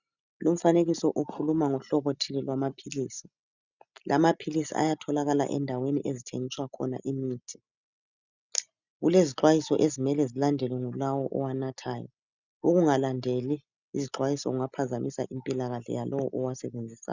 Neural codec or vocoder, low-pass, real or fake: none; 7.2 kHz; real